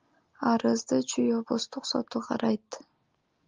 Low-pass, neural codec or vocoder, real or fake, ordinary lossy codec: 7.2 kHz; none; real; Opus, 24 kbps